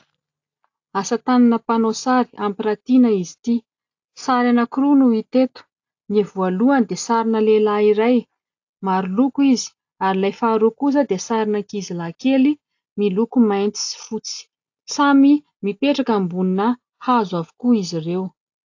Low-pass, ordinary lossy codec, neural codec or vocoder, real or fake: 7.2 kHz; AAC, 48 kbps; none; real